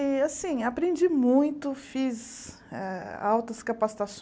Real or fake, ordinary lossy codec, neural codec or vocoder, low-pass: real; none; none; none